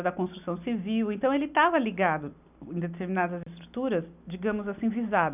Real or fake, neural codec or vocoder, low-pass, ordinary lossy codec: real; none; 3.6 kHz; none